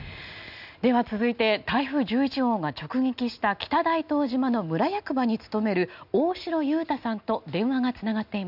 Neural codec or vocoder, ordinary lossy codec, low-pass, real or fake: none; none; 5.4 kHz; real